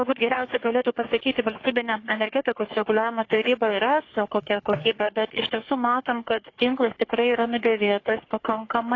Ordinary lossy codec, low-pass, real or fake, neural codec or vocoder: AAC, 32 kbps; 7.2 kHz; fake; codec, 44.1 kHz, 3.4 kbps, Pupu-Codec